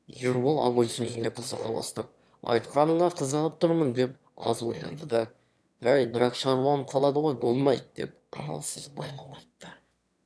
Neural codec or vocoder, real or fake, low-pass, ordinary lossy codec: autoencoder, 22.05 kHz, a latent of 192 numbers a frame, VITS, trained on one speaker; fake; none; none